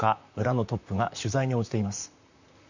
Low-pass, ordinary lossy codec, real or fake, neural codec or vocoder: 7.2 kHz; none; fake; vocoder, 44.1 kHz, 128 mel bands, Pupu-Vocoder